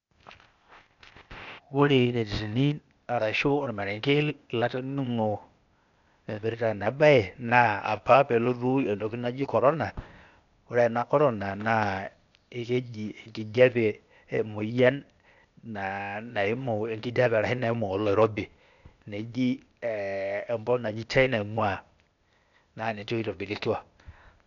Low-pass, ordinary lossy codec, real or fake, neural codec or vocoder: 7.2 kHz; none; fake; codec, 16 kHz, 0.8 kbps, ZipCodec